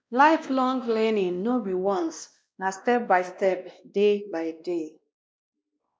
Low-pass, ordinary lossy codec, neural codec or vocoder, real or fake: none; none; codec, 16 kHz, 1 kbps, X-Codec, WavLM features, trained on Multilingual LibriSpeech; fake